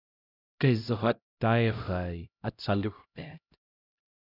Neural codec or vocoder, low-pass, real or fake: codec, 16 kHz, 0.5 kbps, X-Codec, HuBERT features, trained on LibriSpeech; 5.4 kHz; fake